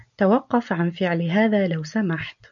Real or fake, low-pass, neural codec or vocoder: real; 7.2 kHz; none